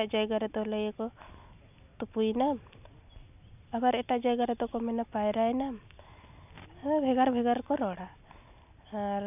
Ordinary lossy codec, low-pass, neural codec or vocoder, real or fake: none; 3.6 kHz; none; real